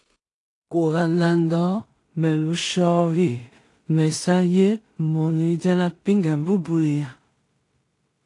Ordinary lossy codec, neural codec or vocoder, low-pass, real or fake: AAC, 48 kbps; codec, 16 kHz in and 24 kHz out, 0.4 kbps, LongCat-Audio-Codec, two codebook decoder; 10.8 kHz; fake